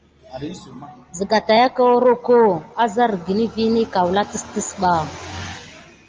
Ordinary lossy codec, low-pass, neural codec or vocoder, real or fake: Opus, 24 kbps; 7.2 kHz; none; real